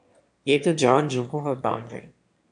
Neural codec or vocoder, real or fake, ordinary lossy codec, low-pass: autoencoder, 22.05 kHz, a latent of 192 numbers a frame, VITS, trained on one speaker; fake; AAC, 64 kbps; 9.9 kHz